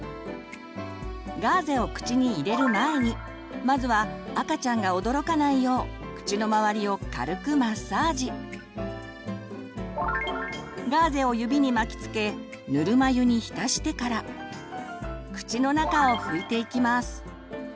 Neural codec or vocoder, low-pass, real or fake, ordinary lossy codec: none; none; real; none